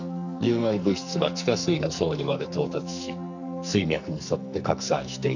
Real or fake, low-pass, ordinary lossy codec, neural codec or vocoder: fake; 7.2 kHz; none; codec, 44.1 kHz, 2.6 kbps, SNAC